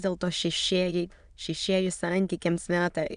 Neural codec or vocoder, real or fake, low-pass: autoencoder, 22.05 kHz, a latent of 192 numbers a frame, VITS, trained on many speakers; fake; 9.9 kHz